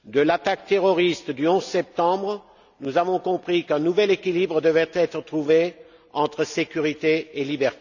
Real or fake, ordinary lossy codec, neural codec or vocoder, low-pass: real; none; none; 7.2 kHz